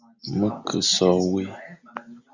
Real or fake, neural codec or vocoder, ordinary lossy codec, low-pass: real; none; Opus, 64 kbps; 7.2 kHz